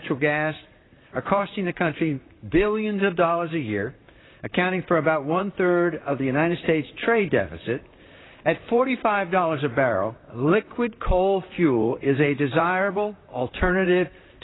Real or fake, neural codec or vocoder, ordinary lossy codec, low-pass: fake; vocoder, 44.1 kHz, 128 mel bands, Pupu-Vocoder; AAC, 16 kbps; 7.2 kHz